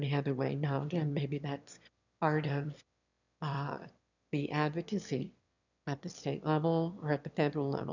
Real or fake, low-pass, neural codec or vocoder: fake; 7.2 kHz; autoencoder, 22.05 kHz, a latent of 192 numbers a frame, VITS, trained on one speaker